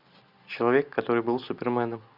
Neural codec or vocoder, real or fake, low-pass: none; real; 5.4 kHz